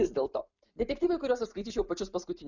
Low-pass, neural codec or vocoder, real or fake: 7.2 kHz; none; real